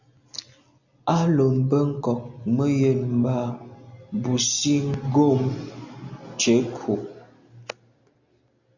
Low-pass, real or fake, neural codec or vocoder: 7.2 kHz; real; none